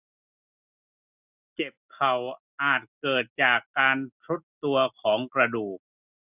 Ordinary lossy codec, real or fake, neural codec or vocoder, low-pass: none; real; none; 3.6 kHz